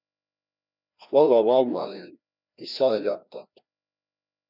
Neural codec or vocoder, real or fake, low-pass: codec, 16 kHz, 1 kbps, FreqCodec, larger model; fake; 5.4 kHz